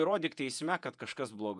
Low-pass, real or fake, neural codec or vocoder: 10.8 kHz; fake; vocoder, 48 kHz, 128 mel bands, Vocos